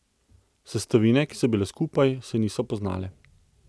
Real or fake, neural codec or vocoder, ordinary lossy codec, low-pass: real; none; none; none